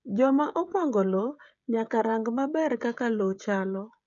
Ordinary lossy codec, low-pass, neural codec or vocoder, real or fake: none; 7.2 kHz; codec, 16 kHz, 16 kbps, FreqCodec, smaller model; fake